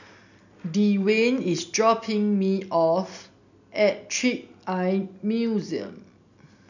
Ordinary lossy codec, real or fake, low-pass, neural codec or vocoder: none; real; 7.2 kHz; none